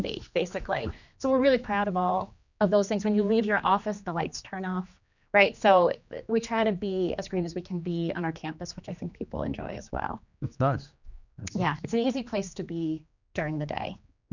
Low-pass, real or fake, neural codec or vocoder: 7.2 kHz; fake; codec, 16 kHz, 2 kbps, X-Codec, HuBERT features, trained on general audio